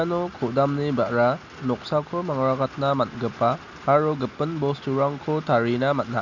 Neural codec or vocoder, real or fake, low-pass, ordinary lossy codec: none; real; 7.2 kHz; none